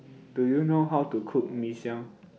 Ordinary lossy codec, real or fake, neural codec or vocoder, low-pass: none; real; none; none